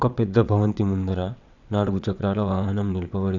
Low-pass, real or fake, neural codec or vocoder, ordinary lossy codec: 7.2 kHz; fake; vocoder, 22.05 kHz, 80 mel bands, WaveNeXt; none